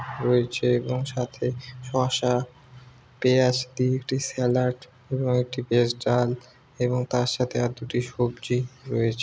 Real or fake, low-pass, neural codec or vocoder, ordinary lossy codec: real; none; none; none